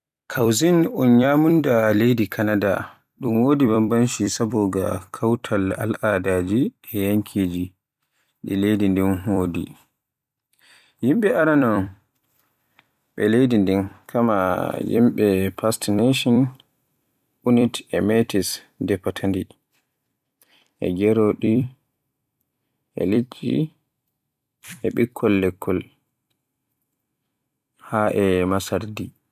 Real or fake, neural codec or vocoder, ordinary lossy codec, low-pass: fake; vocoder, 44.1 kHz, 128 mel bands every 256 samples, BigVGAN v2; none; 14.4 kHz